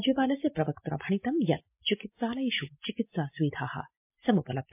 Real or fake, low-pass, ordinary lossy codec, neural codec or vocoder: real; 3.6 kHz; MP3, 32 kbps; none